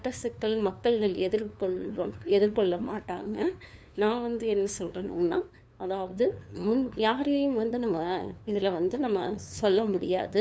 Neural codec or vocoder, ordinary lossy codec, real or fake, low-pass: codec, 16 kHz, 2 kbps, FunCodec, trained on LibriTTS, 25 frames a second; none; fake; none